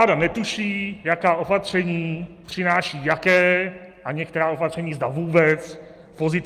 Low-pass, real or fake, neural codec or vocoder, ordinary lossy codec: 14.4 kHz; real; none; Opus, 32 kbps